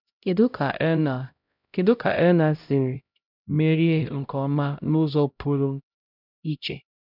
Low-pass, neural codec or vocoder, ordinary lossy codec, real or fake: 5.4 kHz; codec, 16 kHz, 0.5 kbps, X-Codec, HuBERT features, trained on LibriSpeech; none; fake